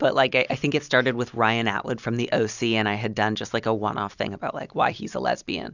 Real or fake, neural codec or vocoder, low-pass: real; none; 7.2 kHz